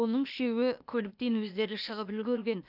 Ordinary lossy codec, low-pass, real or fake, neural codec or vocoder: none; 5.4 kHz; fake; codec, 16 kHz, 0.8 kbps, ZipCodec